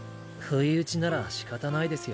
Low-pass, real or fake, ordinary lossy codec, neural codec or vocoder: none; real; none; none